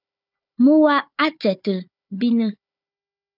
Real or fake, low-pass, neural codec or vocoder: fake; 5.4 kHz; codec, 16 kHz, 4 kbps, FunCodec, trained on Chinese and English, 50 frames a second